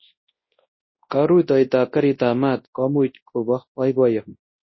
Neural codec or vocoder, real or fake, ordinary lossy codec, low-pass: codec, 24 kHz, 0.9 kbps, WavTokenizer, large speech release; fake; MP3, 24 kbps; 7.2 kHz